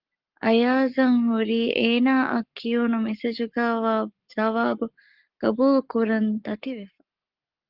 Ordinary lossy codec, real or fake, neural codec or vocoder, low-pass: Opus, 32 kbps; fake; codec, 44.1 kHz, 7.8 kbps, Pupu-Codec; 5.4 kHz